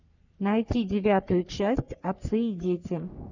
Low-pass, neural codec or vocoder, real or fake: 7.2 kHz; codec, 44.1 kHz, 3.4 kbps, Pupu-Codec; fake